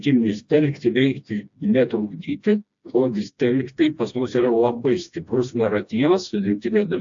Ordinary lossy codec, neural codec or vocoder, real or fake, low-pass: AAC, 64 kbps; codec, 16 kHz, 1 kbps, FreqCodec, smaller model; fake; 7.2 kHz